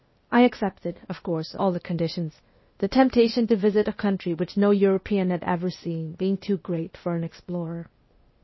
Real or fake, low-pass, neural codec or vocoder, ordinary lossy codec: fake; 7.2 kHz; codec, 16 kHz, 0.8 kbps, ZipCodec; MP3, 24 kbps